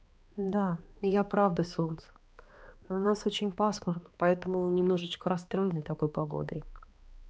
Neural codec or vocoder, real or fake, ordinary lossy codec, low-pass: codec, 16 kHz, 2 kbps, X-Codec, HuBERT features, trained on balanced general audio; fake; none; none